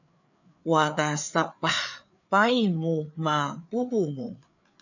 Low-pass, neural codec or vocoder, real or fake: 7.2 kHz; codec, 16 kHz, 4 kbps, FreqCodec, larger model; fake